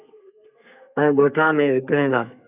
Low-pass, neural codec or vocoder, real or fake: 3.6 kHz; codec, 32 kHz, 1.9 kbps, SNAC; fake